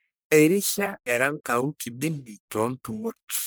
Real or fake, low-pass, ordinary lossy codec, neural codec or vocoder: fake; none; none; codec, 44.1 kHz, 1.7 kbps, Pupu-Codec